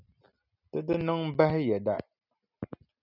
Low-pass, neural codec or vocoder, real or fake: 5.4 kHz; none; real